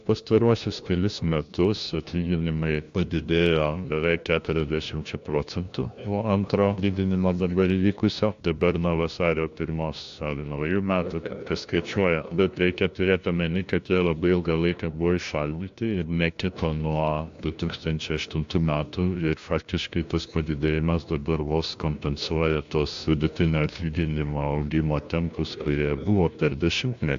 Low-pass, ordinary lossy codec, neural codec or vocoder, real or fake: 7.2 kHz; AAC, 64 kbps; codec, 16 kHz, 1 kbps, FunCodec, trained on LibriTTS, 50 frames a second; fake